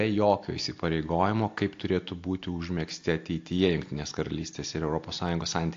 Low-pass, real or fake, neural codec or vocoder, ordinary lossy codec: 7.2 kHz; real; none; AAC, 48 kbps